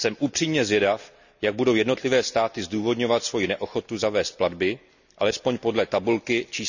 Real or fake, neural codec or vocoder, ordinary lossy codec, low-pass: real; none; none; 7.2 kHz